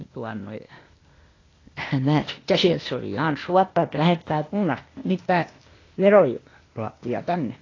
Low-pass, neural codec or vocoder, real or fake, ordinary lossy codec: 7.2 kHz; codec, 16 kHz, 0.8 kbps, ZipCodec; fake; AAC, 32 kbps